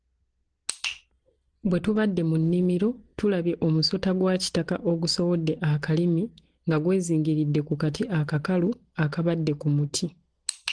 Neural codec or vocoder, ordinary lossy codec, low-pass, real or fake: none; Opus, 16 kbps; 9.9 kHz; real